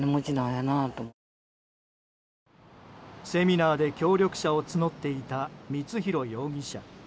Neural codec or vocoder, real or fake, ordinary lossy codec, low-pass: none; real; none; none